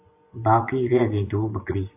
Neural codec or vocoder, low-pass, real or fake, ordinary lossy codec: vocoder, 24 kHz, 100 mel bands, Vocos; 3.6 kHz; fake; AAC, 24 kbps